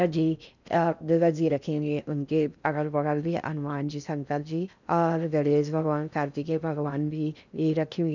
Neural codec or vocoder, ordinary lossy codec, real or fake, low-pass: codec, 16 kHz in and 24 kHz out, 0.6 kbps, FocalCodec, streaming, 4096 codes; none; fake; 7.2 kHz